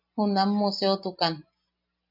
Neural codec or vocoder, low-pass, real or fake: none; 5.4 kHz; real